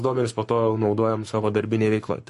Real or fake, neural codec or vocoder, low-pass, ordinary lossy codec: fake; codec, 44.1 kHz, 7.8 kbps, Pupu-Codec; 14.4 kHz; MP3, 48 kbps